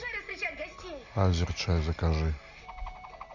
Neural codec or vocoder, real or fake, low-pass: none; real; 7.2 kHz